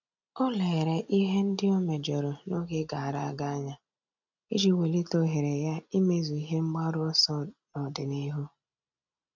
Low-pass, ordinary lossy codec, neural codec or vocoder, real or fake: 7.2 kHz; none; none; real